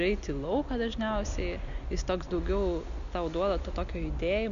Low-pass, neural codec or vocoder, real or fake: 7.2 kHz; none; real